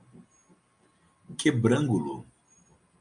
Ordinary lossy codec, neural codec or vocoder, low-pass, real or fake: MP3, 64 kbps; none; 9.9 kHz; real